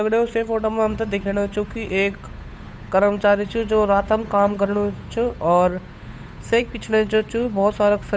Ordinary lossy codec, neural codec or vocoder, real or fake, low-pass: none; codec, 16 kHz, 8 kbps, FunCodec, trained on Chinese and English, 25 frames a second; fake; none